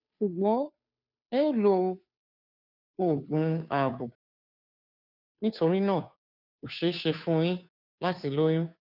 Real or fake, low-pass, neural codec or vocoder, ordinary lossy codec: fake; 5.4 kHz; codec, 16 kHz, 2 kbps, FunCodec, trained on Chinese and English, 25 frames a second; none